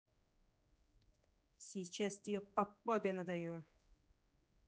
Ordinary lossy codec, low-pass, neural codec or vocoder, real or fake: none; none; codec, 16 kHz, 2 kbps, X-Codec, HuBERT features, trained on general audio; fake